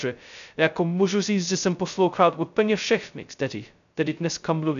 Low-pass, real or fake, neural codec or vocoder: 7.2 kHz; fake; codec, 16 kHz, 0.2 kbps, FocalCodec